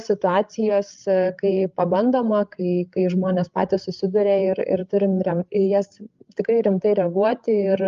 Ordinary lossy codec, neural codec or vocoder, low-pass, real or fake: Opus, 32 kbps; codec, 16 kHz, 16 kbps, FreqCodec, larger model; 7.2 kHz; fake